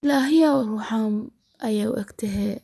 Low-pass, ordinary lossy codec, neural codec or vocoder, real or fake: none; none; vocoder, 24 kHz, 100 mel bands, Vocos; fake